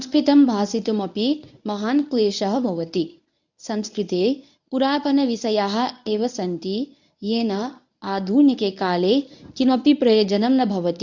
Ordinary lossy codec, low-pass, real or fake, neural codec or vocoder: none; 7.2 kHz; fake; codec, 24 kHz, 0.9 kbps, WavTokenizer, medium speech release version 1